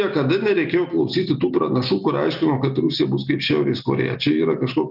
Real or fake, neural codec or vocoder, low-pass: real; none; 5.4 kHz